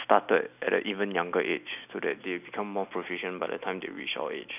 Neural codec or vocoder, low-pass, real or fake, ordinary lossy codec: none; 3.6 kHz; real; none